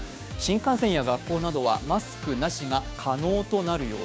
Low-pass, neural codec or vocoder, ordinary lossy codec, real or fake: none; codec, 16 kHz, 6 kbps, DAC; none; fake